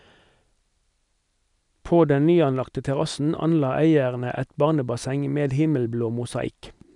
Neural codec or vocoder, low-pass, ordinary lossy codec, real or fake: none; 10.8 kHz; none; real